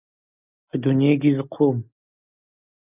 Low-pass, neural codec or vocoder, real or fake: 3.6 kHz; none; real